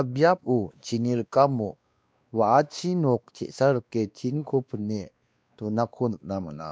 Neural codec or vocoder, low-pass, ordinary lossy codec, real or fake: codec, 16 kHz, 2 kbps, X-Codec, WavLM features, trained on Multilingual LibriSpeech; none; none; fake